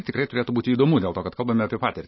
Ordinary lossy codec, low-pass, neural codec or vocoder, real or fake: MP3, 24 kbps; 7.2 kHz; none; real